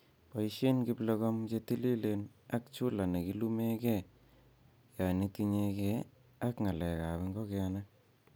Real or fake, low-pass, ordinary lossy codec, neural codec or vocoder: real; none; none; none